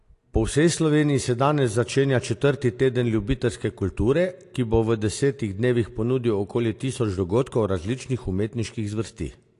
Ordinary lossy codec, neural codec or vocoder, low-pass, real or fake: AAC, 48 kbps; none; 10.8 kHz; real